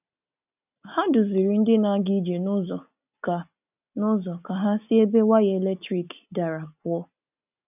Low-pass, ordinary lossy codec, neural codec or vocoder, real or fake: 3.6 kHz; none; none; real